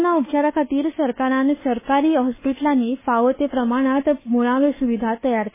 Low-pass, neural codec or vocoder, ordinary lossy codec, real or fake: 3.6 kHz; codec, 24 kHz, 1.2 kbps, DualCodec; MP3, 16 kbps; fake